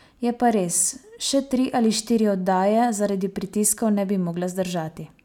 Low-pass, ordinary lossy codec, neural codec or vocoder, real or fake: 19.8 kHz; none; none; real